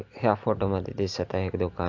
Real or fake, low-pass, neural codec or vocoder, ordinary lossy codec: real; 7.2 kHz; none; AAC, 48 kbps